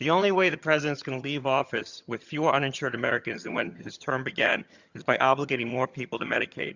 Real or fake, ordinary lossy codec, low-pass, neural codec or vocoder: fake; Opus, 64 kbps; 7.2 kHz; vocoder, 22.05 kHz, 80 mel bands, HiFi-GAN